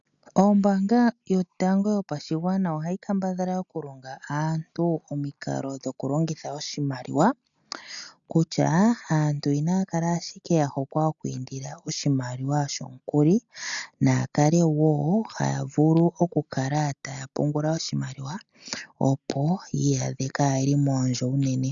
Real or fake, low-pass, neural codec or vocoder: real; 7.2 kHz; none